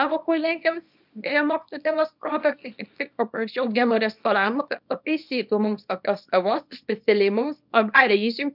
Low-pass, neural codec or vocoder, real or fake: 5.4 kHz; codec, 24 kHz, 0.9 kbps, WavTokenizer, small release; fake